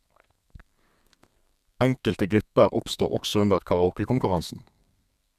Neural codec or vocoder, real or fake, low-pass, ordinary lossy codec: codec, 32 kHz, 1.9 kbps, SNAC; fake; 14.4 kHz; none